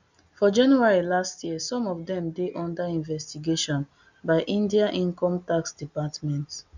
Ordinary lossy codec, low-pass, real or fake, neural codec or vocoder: none; 7.2 kHz; real; none